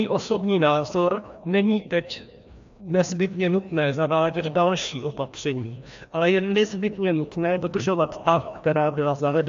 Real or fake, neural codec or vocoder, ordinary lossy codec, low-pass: fake; codec, 16 kHz, 1 kbps, FreqCodec, larger model; AAC, 64 kbps; 7.2 kHz